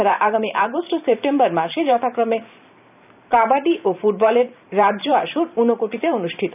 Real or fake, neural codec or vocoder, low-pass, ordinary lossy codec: fake; vocoder, 44.1 kHz, 128 mel bands every 512 samples, BigVGAN v2; 3.6 kHz; none